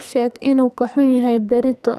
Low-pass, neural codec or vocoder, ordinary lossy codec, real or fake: 14.4 kHz; codec, 44.1 kHz, 2.6 kbps, SNAC; none; fake